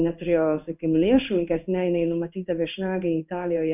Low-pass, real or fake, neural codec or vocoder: 3.6 kHz; fake; codec, 16 kHz in and 24 kHz out, 1 kbps, XY-Tokenizer